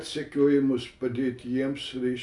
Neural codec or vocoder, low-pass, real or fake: none; 10.8 kHz; real